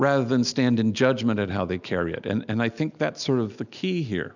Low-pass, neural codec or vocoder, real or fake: 7.2 kHz; none; real